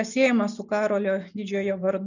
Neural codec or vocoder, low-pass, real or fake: none; 7.2 kHz; real